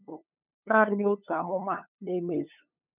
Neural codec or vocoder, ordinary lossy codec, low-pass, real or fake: codec, 16 kHz, 8 kbps, FunCodec, trained on LibriTTS, 25 frames a second; none; 3.6 kHz; fake